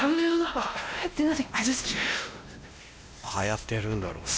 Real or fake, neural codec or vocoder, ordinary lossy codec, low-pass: fake; codec, 16 kHz, 1 kbps, X-Codec, WavLM features, trained on Multilingual LibriSpeech; none; none